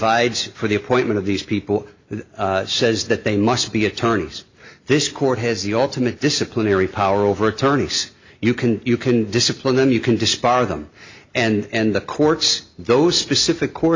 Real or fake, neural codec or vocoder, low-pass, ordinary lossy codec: real; none; 7.2 kHz; MP3, 64 kbps